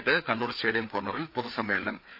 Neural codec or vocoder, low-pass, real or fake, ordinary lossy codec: codec, 16 kHz, 4 kbps, FreqCodec, larger model; 5.4 kHz; fake; none